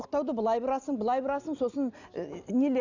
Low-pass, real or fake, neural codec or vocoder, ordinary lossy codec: 7.2 kHz; real; none; none